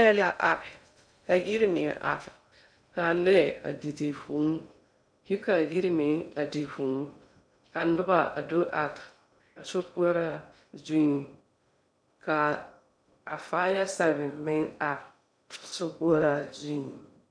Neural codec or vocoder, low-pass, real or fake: codec, 16 kHz in and 24 kHz out, 0.6 kbps, FocalCodec, streaming, 2048 codes; 9.9 kHz; fake